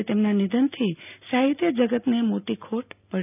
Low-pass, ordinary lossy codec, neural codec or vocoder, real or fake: 3.6 kHz; none; none; real